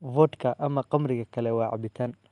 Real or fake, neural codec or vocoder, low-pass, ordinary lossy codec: real; none; 10.8 kHz; Opus, 32 kbps